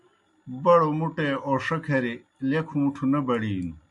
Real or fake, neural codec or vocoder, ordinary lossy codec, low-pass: real; none; MP3, 64 kbps; 10.8 kHz